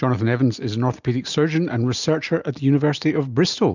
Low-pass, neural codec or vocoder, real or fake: 7.2 kHz; none; real